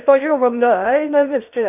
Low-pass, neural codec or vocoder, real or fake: 3.6 kHz; codec, 16 kHz in and 24 kHz out, 0.6 kbps, FocalCodec, streaming, 2048 codes; fake